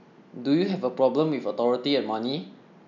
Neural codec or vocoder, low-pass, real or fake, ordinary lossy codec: none; 7.2 kHz; real; none